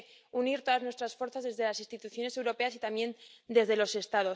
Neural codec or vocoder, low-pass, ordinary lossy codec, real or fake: none; none; none; real